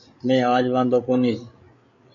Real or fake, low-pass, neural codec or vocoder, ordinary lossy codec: fake; 7.2 kHz; codec, 16 kHz, 8 kbps, FreqCodec, larger model; AAC, 48 kbps